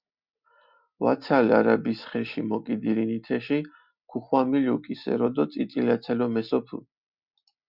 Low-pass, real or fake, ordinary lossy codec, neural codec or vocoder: 5.4 kHz; real; Opus, 64 kbps; none